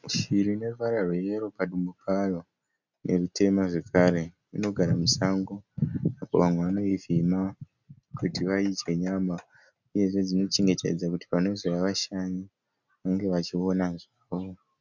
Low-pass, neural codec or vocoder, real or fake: 7.2 kHz; none; real